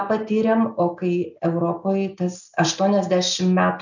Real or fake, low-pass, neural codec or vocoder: real; 7.2 kHz; none